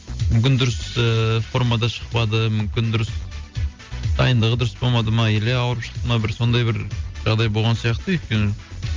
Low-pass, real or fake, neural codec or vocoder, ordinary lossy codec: 7.2 kHz; real; none; Opus, 32 kbps